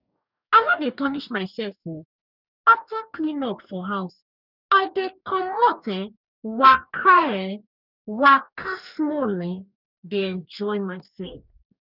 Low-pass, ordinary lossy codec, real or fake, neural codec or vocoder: 5.4 kHz; none; fake; codec, 44.1 kHz, 2.6 kbps, DAC